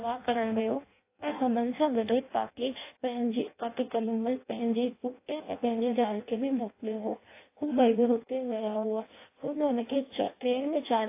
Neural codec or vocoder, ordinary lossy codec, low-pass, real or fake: codec, 16 kHz in and 24 kHz out, 0.6 kbps, FireRedTTS-2 codec; AAC, 24 kbps; 3.6 kHz; fake